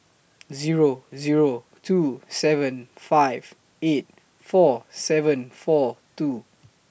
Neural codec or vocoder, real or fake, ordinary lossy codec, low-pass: none; real; none; none